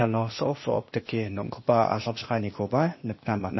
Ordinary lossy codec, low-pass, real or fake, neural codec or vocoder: MP3, 24 kbps; 7.2 kHz; fake; codec, 16 kHz, 0.8 kbps, ZipCodec